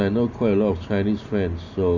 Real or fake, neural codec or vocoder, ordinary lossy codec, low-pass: real; none; none; 7.2 kHz